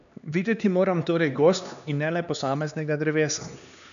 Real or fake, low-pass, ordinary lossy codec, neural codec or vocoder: fake; 7.2 kHz; none; codec, 16 kHz, 2 kbps, X-Codec, HuBERT features, trained on LibriSpeech